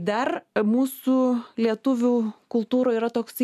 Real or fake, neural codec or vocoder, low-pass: real; none; 14.4 kHz